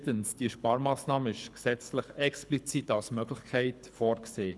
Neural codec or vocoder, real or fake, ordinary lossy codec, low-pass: codec, 24 kHz, 6 kbps, HILCodec; fake; none; none